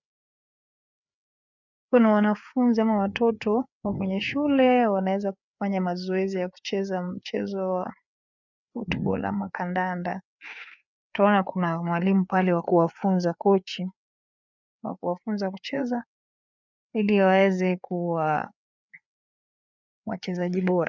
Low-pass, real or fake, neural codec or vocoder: 7.2 kHz; fake; codec, 16 kHz, 4 kbps, FreqCodec, larger model